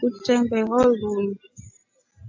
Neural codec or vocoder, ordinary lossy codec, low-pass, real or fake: none; AAC, 48 kbps; 7.2 kHz; real